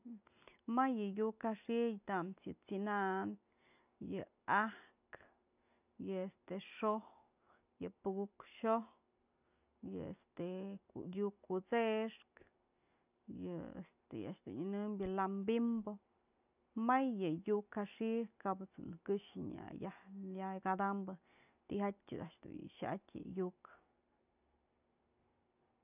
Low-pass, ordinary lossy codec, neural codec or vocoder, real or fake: 3.6 kHz; none; none; real